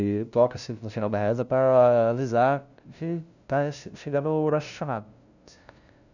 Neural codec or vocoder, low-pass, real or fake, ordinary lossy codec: codec, 16 kHz, 0.5 kbps, FunCodec, trained on LibriTTS, 25 frames a second; 7.2 kHz; fake; none